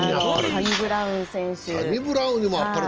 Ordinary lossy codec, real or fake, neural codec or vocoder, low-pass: Opus, 24 kbps; real; none; 7.2 kHz